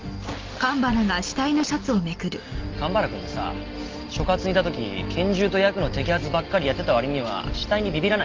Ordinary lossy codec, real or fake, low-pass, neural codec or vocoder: Opus, 24 kbps; real; 7.2 kHz; none